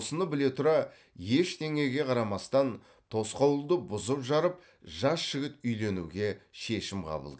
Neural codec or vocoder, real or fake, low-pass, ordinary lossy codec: none; real; none; none